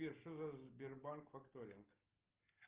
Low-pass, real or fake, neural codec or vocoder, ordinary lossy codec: 3.6 kHz; real; none; Opus, 32 kbps